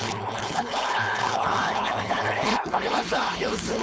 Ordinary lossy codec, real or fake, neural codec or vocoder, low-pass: none; fake; codec, 16 kHz, 4.8 kbps, FACodec; none